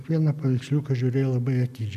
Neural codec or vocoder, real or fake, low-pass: none; real; 14.4 kHz